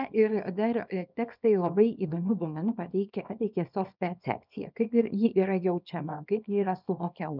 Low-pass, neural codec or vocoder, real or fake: 5.4 kHz; codec, 24 kHz, 0.9 kbps, WavTokenizer, small release; fake